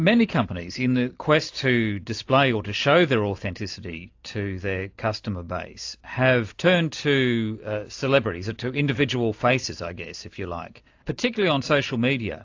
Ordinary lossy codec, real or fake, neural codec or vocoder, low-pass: AAC, 48 kbps; real; none; 7.2 kHz